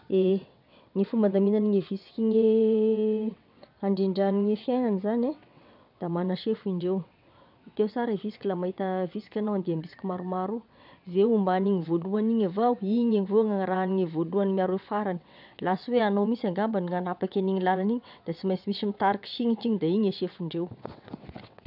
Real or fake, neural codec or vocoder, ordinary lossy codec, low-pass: fake; vocoder, 22.05 kHz, 80 mel bands, WaveNeXt; none; 5.4 kHz